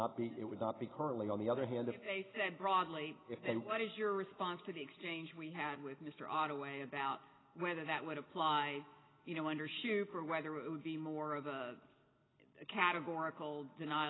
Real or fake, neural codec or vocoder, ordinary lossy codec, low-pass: real; none; AAC, 16 kbps; 7.2 kHz